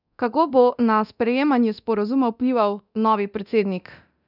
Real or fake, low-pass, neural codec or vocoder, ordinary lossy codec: fake; 5.4 kHz; codec, 24 kHz, 0.9 kbps, DualCodec; none